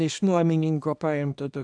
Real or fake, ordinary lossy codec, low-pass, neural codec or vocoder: fake; AAC, 64 kbps; 9.9 kHz; codec, 24 kHz, 0.9 kbps, WavTokenizer, small release